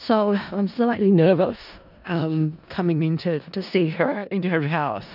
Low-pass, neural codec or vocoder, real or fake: 5.4 kHz; codec, 16 kHz in and 24 kHz out, 0.4 kbps, LongCat-Audio-Codec, four codebook decoder; fake